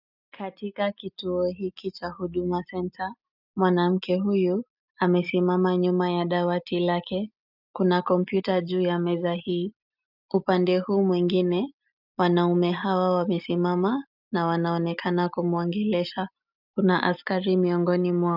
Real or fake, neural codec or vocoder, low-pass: real; none; 5.4 kHz